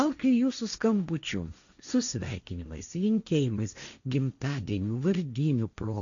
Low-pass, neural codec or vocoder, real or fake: 7.2 kHz; codec, 16 kHz, 1.1 kbps, Voila-Tokenizer; fake